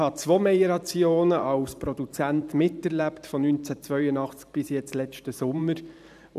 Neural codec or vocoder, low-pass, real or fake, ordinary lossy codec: vocoder, 44.1 kHz, 128 mel bands every 512 samples, BigVGAN v2; 14.4 kHz; fake; none